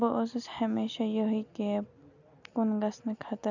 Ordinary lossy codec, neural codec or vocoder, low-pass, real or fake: none; none; 7.2 kHz; real